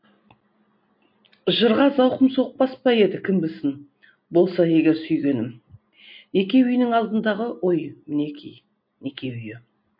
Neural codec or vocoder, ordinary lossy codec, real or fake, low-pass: none; MP3, 32 kbps; real; 5.4 kHz